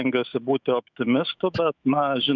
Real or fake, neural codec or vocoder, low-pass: fake; autoencoder, 48 kHz, 128 numbers a frame, DAC-VAE, trained on Japanese speech; 7.2 kHz